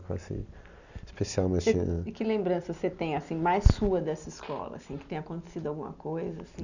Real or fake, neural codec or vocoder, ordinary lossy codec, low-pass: real; none; none; 7.2 kHz